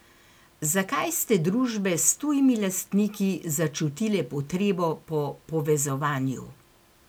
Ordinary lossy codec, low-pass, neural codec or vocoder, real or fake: none; none; none; real